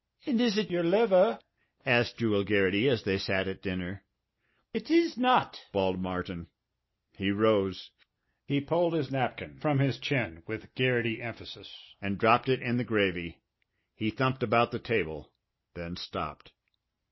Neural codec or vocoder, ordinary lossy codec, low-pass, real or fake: none; MP3, 24 kbps; 7.2 kHz; real